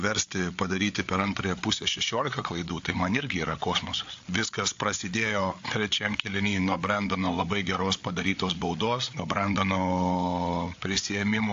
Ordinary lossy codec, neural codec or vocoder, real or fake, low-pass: AAC, 48 kbps; codec, 16 kHz, 8 kbps, FreqCodec, larger model; fake; 7.2 kHz